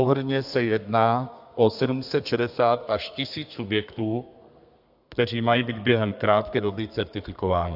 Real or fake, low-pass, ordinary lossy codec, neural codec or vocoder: fake; 5.4 kHz; AAC, 48 kbps; codec, 32 kHz, 1.9 kbps, SNAC